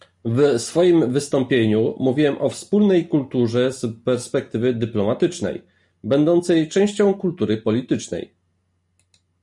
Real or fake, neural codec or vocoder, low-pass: real; none; 10.8 kHz